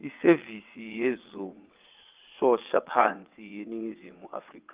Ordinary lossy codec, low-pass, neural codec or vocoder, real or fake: Opus, 32 kbps; 3.6 kHz; vocoder, 22.05 kHz, 80 mel bands, WaveNeXt; fake